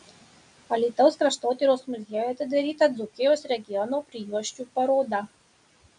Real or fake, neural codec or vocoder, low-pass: real; none; 9.9 kHz